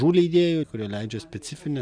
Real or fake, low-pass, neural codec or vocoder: real; 9.9 kHz; none